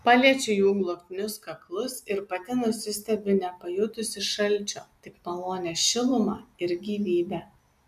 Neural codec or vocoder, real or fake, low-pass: none; real; 14.4 kHz